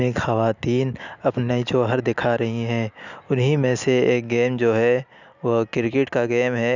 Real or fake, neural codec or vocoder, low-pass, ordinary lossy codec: real; none; 7.2 kHz; none